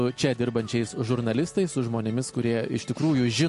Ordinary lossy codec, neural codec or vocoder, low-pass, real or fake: MP3, 48 kbps; none; 14.4 kHz; real